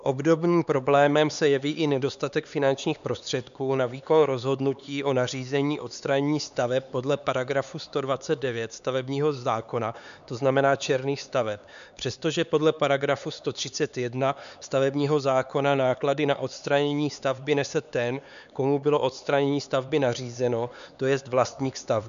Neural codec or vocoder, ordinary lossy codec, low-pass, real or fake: codec, 16 kHz, 4 kbps, X-Codec, HuBERT features, trained on LibriSpeech; MP3, 96 kbps; 7.2 kHz; fake